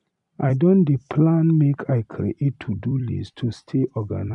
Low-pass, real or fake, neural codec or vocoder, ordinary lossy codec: 10.8 kHz; fake; vocoder, 44.1 kHz, 128 mel bands every 256 samples, BigVGAN v2; none